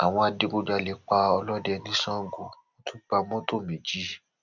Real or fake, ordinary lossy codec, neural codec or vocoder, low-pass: real; none; none; 7.2 kHz